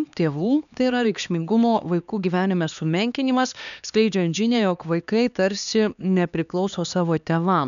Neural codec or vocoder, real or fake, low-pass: codec, 16 kHz, 2 kbps, X-Codec, HuBERT features, trained on LibriSpeech; fake; 7.2 kHz